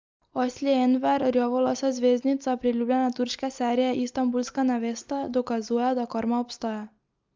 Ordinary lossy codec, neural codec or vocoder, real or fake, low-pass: Opus, 24 kbps; none; real; 7.2 kHz